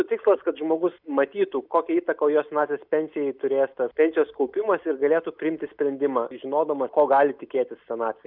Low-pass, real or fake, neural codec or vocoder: 5.4 kHz; real; none